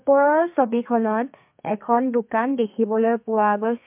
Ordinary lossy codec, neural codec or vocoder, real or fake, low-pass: MP3, 32 kbps; codec, 32 kHz, 1.9 kbps, SNAC; fake; 3.6 kHz